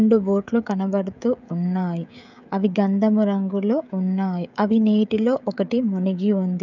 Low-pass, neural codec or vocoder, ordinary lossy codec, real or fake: 7.2 kHz; codec, 16 kHz, 16 kbps, FreqCodec, smaller model; none; fake